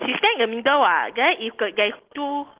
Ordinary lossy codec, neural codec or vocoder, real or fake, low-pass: Opus, 16 kbps; none; real; 3.6 kHz